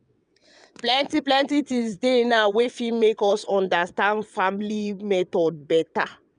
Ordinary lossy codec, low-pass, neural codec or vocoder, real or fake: none; 9.9 kHz; vocoder, 22.05 kHz, 80 mel bands, WaveNeXt; fake